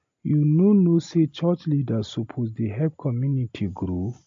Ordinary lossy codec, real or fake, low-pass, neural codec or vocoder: MP3, 48 kbps; real; 7.2 kHz; none